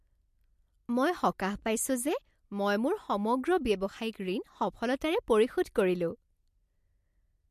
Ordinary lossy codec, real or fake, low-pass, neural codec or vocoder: MP3, 64 kbps; real; 14.4 kHz; none